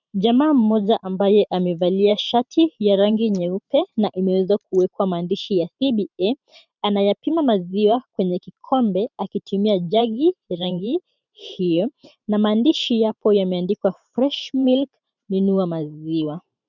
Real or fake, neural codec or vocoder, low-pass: fake; vocoder, 44.1 kHz, 128 mel bands every 512 samples, BigVGAN v2; 7.2 kHz